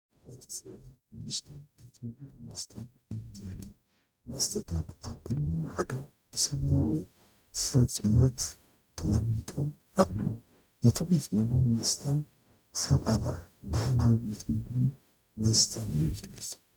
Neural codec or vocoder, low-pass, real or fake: codec, 44.1 kHz, 0.9 kbps, DAC; 19.8 kHz; fake